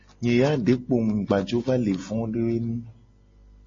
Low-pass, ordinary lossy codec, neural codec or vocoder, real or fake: 7.2 kHz; MP3, 32 kbps; none; real